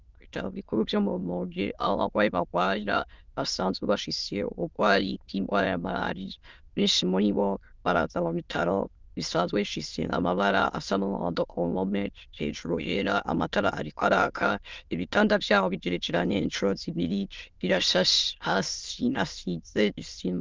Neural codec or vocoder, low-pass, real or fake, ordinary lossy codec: autoencoder, 22.05 kHz, a latent of 192 numbers a frame, VITS, trained on many speakers; 7.2 kHz; fake; Opus, 24 kbps